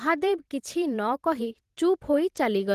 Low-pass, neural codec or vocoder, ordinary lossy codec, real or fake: 14.4 kHz; vocoder, 44.1 kHz, 128 mel bands every 512 samples, BigVGAN v2; Opus, 16 kbps; fake